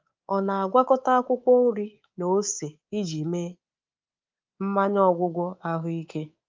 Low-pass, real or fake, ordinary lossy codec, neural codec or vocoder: 7.2 kHz; fake; Opus, 24 kbps; codec, 24 kHz, 3.1 kbps, DualCodec